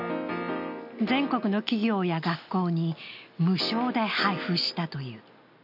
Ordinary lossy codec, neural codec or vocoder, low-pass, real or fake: none; none; 5.4 kHz; real